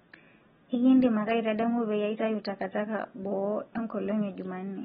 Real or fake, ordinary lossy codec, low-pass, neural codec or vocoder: real; AAC, 16 kbps; 19.8 kHz; none